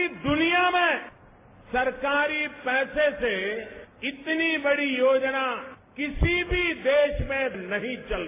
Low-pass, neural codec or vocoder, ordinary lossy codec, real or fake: 3.6 kHz; none; AAC, 24 kbps; real